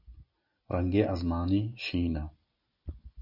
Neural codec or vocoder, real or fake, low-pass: none; real; 5.4 kHz